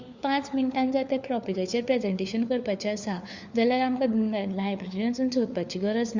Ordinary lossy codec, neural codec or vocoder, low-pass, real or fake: Opus, 64 kbps; codec, 16 kHz, 4 kbps, FunCodec, trained on LibriTTS, 50 frames a second; 7.2 kHz; fake